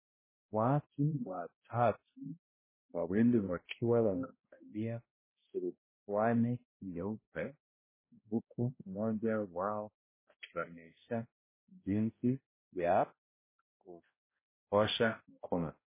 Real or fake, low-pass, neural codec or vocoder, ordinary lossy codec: fake; 3.6 kHz; codec, 16 kHz, 0.5 kbps, X-Codec, HuBERT features, trained on balanced general audio; MP3, 16 kbps